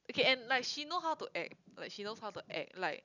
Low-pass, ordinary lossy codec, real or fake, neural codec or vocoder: 7.2 kHz; none; real; none